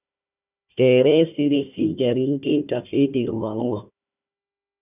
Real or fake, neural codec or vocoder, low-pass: fake; codec, 16 kHz, 1 kbps, FunCodec, trained on Chinese and English, 50 frames a second; 3.6 kHz